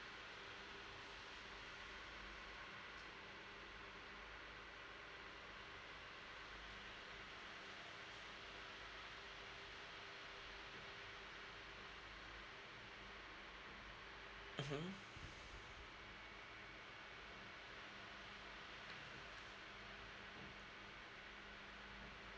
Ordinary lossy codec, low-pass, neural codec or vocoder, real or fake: none; none; none; real